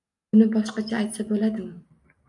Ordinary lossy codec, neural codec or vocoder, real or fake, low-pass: MP3, 96 kbps; none; real; 10.8 kHz